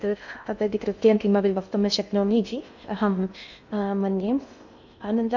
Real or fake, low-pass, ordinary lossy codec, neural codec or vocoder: fake; 7.2 kHz; none; codec, 16 kHz in and 24 kHz out, 0.6 kbps, FocalCodec, streaming, 2048 codes